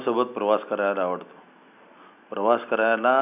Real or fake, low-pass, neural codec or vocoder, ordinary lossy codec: real; 3.6 kHz; none; none